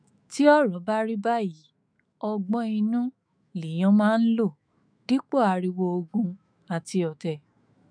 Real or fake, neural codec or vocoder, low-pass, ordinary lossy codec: fake; autoencoder, 48 kHz, 128 numbers a frame, DAC-VAE, trained on Japanese speech; 9.9 kHz; none